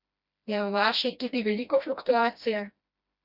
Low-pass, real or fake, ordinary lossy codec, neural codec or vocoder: 5.4 kHz; fake; Opus, 64 kbps; codec, 16 kHz, 1 kbps, FreqCodec, smaller model